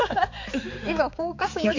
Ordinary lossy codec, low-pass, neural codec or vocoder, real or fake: MP3, 48 kbps; 7.2 kHz; codec, 16 kHz, 4 kbps, X-Codec, HuBERT features, trained on balanced general audio; fake